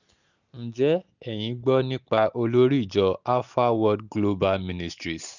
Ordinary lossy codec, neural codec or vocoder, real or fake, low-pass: none; none; real; 7.2 kHz